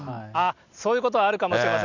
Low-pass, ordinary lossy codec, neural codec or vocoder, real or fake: 7.2 kHz; none; none; real